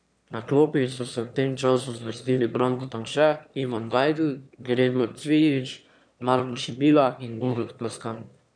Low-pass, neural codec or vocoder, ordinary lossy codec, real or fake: 9.9 kHz; autoencoder, 22.05 kHz, a latent of 192 numbers a frame, VITS, trained on one speaker; none; fake